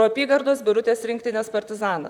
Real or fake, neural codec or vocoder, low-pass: fake; vocoder, 44.1 kHz, 128 mel bands, Pupu-Vocoder; 19.8 kHz